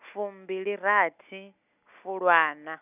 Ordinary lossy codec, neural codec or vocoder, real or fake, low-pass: none; none; real; 3.6 kHz